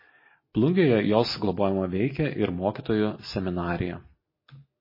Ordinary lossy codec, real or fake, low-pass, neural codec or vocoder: MP3, 24 kbps; real; 5.4 kHz; none